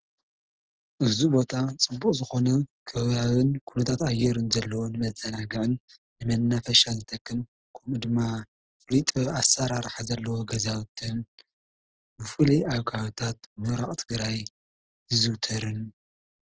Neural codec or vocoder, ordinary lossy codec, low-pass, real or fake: none; Opus, 16 kbps; 7.2 kHz; real